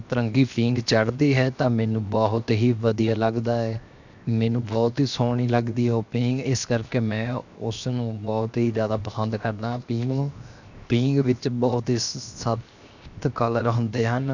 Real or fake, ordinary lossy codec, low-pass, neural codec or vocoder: fake; none; 7.2 kHz; codec, 16 kHz, 0.7 kbps, FocalCodec